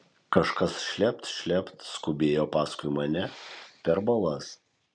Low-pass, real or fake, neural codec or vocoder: 9.9 kHz; fake; vocoder, 44.1 kHz, 128 mel bands every 256 samples, BigVGAN v2